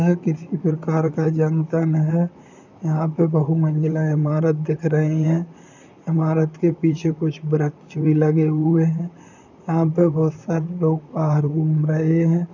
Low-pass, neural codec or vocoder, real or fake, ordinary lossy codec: 7.2 kHz; vocoder, 44.1 kHz, 128 mel bands, Pupu-Vocoder; fake; none